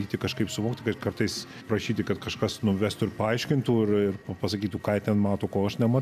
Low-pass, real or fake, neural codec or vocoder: 14.4 kHz; real; none